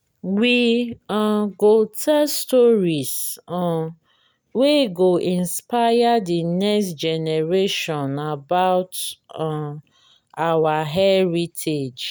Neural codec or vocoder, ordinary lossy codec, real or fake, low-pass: none; none; real; none